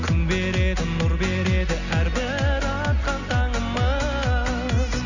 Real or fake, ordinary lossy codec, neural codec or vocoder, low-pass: real; none; none; 7.2 kHz